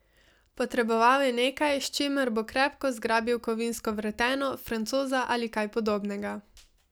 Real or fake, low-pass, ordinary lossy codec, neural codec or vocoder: real; none; none; none